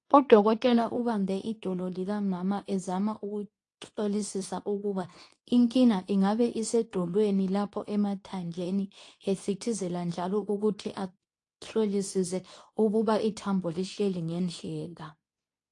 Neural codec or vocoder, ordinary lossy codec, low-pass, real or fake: codec, 24 kHz, 0.9 kbps, WavTokenizer, medium speech release version 2; AAC, 48 kbps; 10.8 kHz; fake